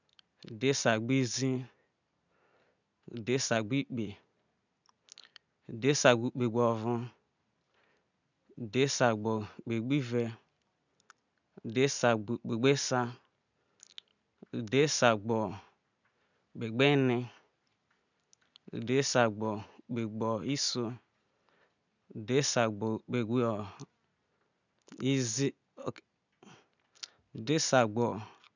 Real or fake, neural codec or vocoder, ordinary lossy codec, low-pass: real; none; none; 7.2 kHz